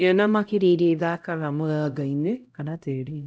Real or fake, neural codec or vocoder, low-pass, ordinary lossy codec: fake; codec, 16 kHz, 0.5 kbps, X-Codec, HuBERT features, trained on LibriSpeech; none; none